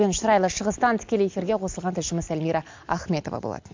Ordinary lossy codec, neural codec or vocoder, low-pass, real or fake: MP3, 64 kbps; none; 7.2 kHz; real